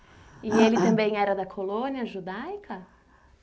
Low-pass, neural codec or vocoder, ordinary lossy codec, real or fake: none; none; none; real